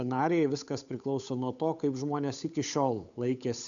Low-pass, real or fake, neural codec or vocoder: 7.2 kHz; fake; codec, 16 kHz, 8 kbps, FunCodec, trained on Chinese and English, 25 frames a second